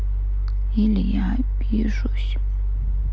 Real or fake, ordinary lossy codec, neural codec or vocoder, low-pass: real; none; none; none